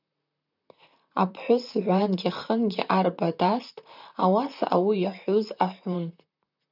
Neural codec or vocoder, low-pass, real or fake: vocoder, 44.1 kHz, 128 mel bands, Pupu-Vocoder; 5.4 kHz; fake